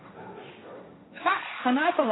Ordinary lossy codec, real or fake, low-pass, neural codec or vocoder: AAC, 16 kbps; fake; 7.2 kHz; codec, 16 kHz, 1.1 kbps, Voila-Tokenizer